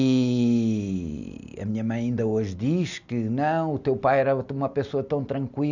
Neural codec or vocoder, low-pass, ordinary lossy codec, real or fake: none; 7.2 kHz; none; real